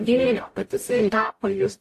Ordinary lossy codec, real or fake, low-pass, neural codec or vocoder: AAC, 64 kbps; fake; 14.4 kHz; codec, 44.1 kHz, 0.9 kbps, DAC